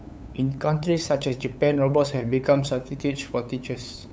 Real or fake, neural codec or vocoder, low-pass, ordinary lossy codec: fake; codec, 16 kHz, 8 kbps, FunCodec, trained on LibriTTS, 25 frames a second; none; none